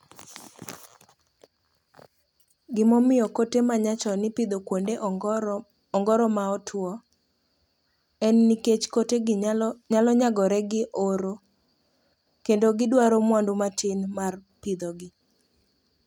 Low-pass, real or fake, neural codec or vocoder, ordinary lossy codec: 19.8 kHz; real; none; none